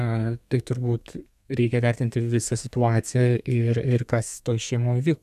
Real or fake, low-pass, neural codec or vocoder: fake; 14.4 kHz; codec, 32 kHz, 1.9 kbps, SNAC